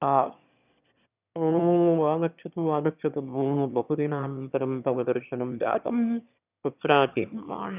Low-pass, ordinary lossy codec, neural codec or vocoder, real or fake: 3.6 kHz; none; autoencoder, 22.05 kHz, a latent of 192 numbers a frame, VITS, trained on one speaker; fake